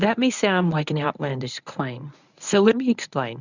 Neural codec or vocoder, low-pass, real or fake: codec, 24 kHz, 0.9 kbps, WavTokenizer, medium speech release version 1; 7.2 kHz; fake